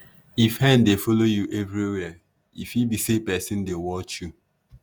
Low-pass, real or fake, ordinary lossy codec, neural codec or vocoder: none; fake; none; vocoder, 48 kHz, 128 mel bands, Vocos